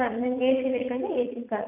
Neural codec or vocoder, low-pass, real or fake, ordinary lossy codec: vocoder, 44.1 kHz, 80 mel bands, Vocos; 3.6 kHz; fake; none